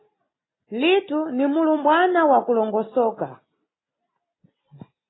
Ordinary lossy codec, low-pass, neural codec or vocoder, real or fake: AAC, 16 kbps; 7.2 kHz; none; real